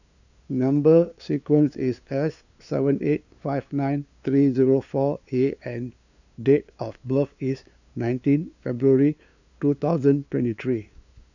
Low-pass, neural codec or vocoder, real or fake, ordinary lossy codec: 7.2 kHz; codec, 16 kHz, 2 kbps, FunCodec, trained on LibriTTS, 25 frames a second; fake; none